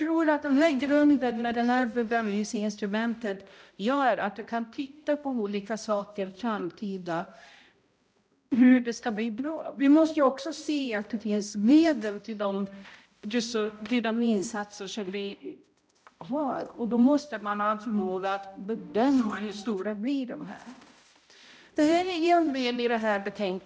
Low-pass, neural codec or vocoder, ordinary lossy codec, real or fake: none; codec, 16 kHz, 0.5 kbps, X-Codec, HuBERT features, trained on balanced general audio; none; fake